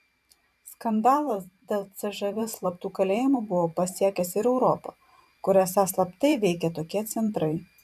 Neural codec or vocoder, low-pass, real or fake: vocoder, 44.1 kHz, 128 mel bands every 512 samples, BigVGAN v2; 14.4 kHz; fake